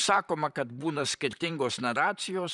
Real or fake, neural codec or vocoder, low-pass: fake; vocoder, 44.1 kHz, 128 mel bands, Pupu-Vocoder; 10.8 kHz